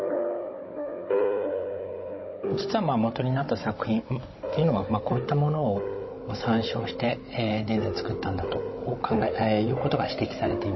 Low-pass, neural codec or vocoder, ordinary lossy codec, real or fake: 7.2 kHz; codec, 16 kHz, 16 kbps, FunCodec, trained on Chinese and English, 50 frames a second; MP3, 24 kbps; fake